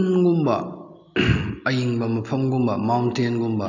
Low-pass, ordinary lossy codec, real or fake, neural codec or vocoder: 7.2 kHz; none; real; none